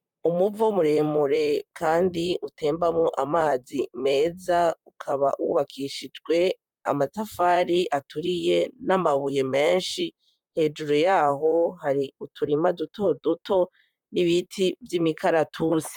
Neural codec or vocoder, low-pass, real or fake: vocoder, 44.1 kHz, 128 mel bands, Pupu-Vocoder; 19.8 kHz; fake